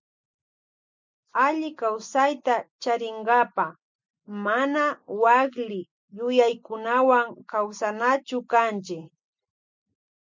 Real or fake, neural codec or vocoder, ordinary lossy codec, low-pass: real; none; MP3, 64 kbps; 7.2 kHz